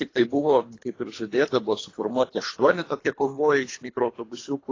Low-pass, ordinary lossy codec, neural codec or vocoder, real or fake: 7.2 kHz; AAC, 32 kbps; codec, 24 kHz, 3 kbps, HILCodec; fake